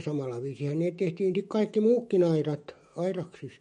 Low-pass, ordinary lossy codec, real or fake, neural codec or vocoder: 19.8 kHz; MP3, 48 kbps; fake; autoencoder, 48 kHz, 128 numbers a frame, DAC-VAE, trained on Japanese speech